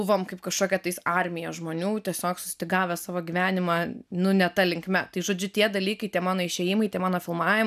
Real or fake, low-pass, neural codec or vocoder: real; 14.4 kHz; none